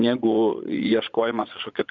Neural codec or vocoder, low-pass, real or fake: vocoder, 22.05 kHz, 80 mel bands, Vocos; 7.2 kHz; fake